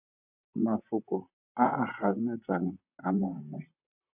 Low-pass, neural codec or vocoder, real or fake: 3.6 kHz; vocoder, 44.1 kHz, 128 mel bands, Pupu-Vocoder; fake